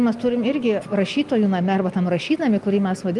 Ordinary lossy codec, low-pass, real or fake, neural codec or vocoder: Opus, 32 kbps; 10.8 kHz; real; none